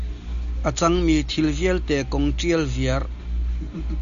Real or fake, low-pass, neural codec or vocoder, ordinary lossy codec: real; 7.2 kHz; none; MP3, 96 kbps